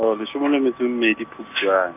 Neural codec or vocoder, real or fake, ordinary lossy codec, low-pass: none; real; none; 3.6 kHz